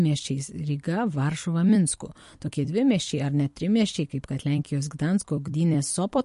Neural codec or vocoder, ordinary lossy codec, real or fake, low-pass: vocoder, 44.1 kHz, 128 mel bands every 256 samples, BigVGAN v2; MP3, 48 kbps; fake; 14.4 kHz